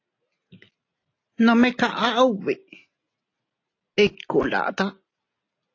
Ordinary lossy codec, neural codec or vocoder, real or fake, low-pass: AAC, 32 kbps; none; real; 7.2 kHz